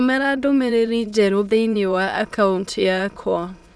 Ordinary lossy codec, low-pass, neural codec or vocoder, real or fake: none; none; autoencoder, 22.05 kHz, a latent of 192 numbers a frame, VITS, trained on many speakers; fake